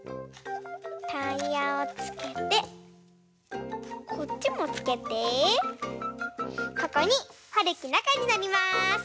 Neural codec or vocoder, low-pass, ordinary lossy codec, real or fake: none; none; none; real